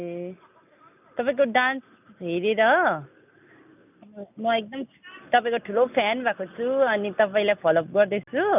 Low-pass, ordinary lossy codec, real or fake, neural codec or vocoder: 3.6 kHz; none; real; none